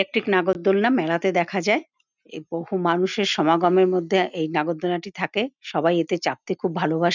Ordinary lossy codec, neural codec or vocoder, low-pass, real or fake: none; none; 7.2 kHz; real